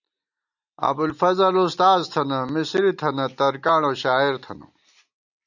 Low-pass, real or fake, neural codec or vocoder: 7.2 kHz; real; none